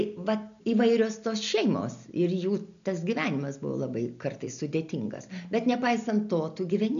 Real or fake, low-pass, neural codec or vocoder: real; 7.2 kHz; none